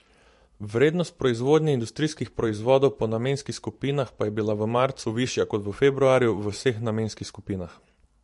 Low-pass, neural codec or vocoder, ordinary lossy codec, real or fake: 14.4 kHz; vocoder, 44.1 kHz, 128 mel bands every 512 samples, BigVGAN v2; MP3, 48 kbps; fake